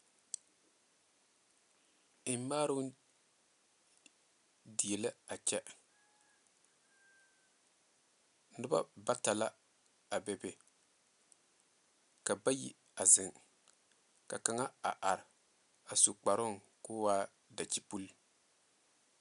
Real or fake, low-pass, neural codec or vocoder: real; 10.8 kHz; none